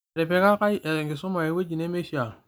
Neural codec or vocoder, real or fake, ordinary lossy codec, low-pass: none; real; none; none